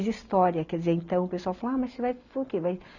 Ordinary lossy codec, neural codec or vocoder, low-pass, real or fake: none; none; 7.2 kHz; real